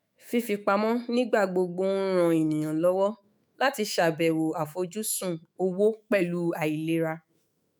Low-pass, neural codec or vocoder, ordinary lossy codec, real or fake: none; autoencoder, 48 kHz, 128 numbers a frame, DAC-VAE, trained on Japanese speech; none; fake